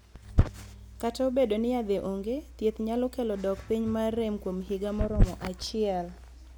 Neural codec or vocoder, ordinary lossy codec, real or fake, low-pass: none; none; real; none